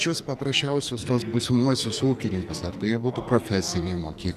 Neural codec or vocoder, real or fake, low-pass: codec, 44.1 kHz, 2.6 kbps, SNAC; fake; 14.4 kHz